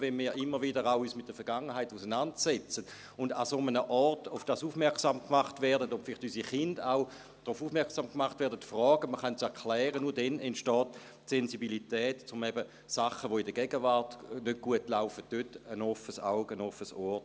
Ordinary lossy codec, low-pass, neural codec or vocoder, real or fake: none; none; none; real